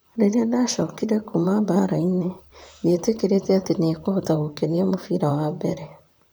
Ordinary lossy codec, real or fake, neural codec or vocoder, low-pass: none; fake; vocoder, 44.1 kHz, 128 mel bands, Pupu-Vocoder; none